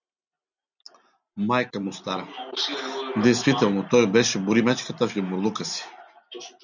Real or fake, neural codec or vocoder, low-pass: real; none; 7.2 kHz